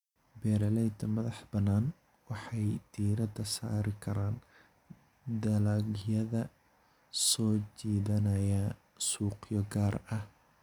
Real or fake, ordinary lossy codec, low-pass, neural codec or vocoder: real; none; 19.8 kHz; none